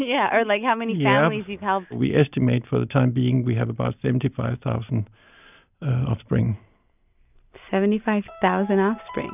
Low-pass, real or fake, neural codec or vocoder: 3.6 kHz; real; none